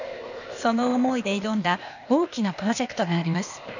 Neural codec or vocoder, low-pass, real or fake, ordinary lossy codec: codec, 16 kHz, 0.8 kbps, ZipCodec; 7.2 kHz; fake; none